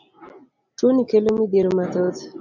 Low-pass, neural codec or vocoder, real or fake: 7.2 kHz; none; real